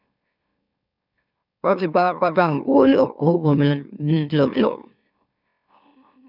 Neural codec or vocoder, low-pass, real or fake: autoencoder, 44.1 kHz, a latent of 192 numbers a frame, MeloTTS; 5.4 kHz; fake